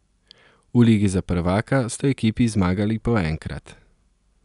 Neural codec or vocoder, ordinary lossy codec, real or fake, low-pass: none; none; real; 10.8 kHz